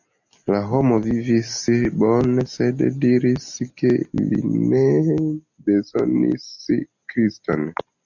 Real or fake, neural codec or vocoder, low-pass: real; none; 7.2 kHz